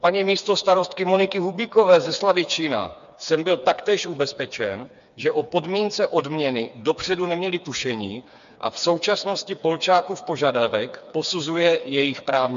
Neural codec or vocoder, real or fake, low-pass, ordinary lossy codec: codec, 16 kHz, 4 kbps, FreqCodec, smaller model; fake; 7.2 kHz; MP3, 64 kbps